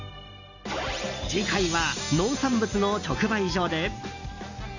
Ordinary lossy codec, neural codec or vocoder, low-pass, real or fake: none; none; 7.2 kHz; real